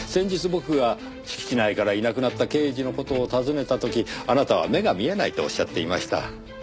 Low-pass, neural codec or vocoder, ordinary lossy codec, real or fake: none; none; none; real